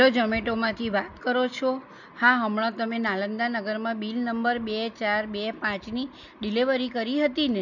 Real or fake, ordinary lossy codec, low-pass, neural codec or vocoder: real; none; 7.2 kHz; none